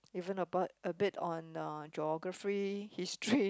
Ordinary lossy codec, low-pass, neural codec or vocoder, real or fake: none; none; none; real